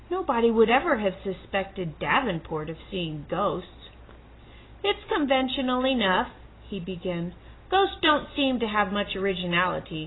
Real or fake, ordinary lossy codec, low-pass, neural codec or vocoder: real; AAC, 16 kbps; 7.2 kHz; none